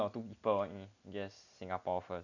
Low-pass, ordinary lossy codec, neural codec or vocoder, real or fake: 7.2 kHz; none; none; real